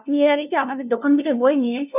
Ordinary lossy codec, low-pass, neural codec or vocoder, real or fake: none; 3.6 kHz; codec, 16 kHz, 0.5 kbps, FunCodec, trained on LibriTTS, 25 frames a second; fake